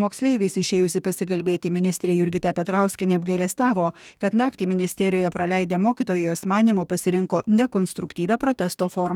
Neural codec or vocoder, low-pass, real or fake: codec, 44.1 kHz, 2.6 kbps, DAC; 19.8 kHz; fake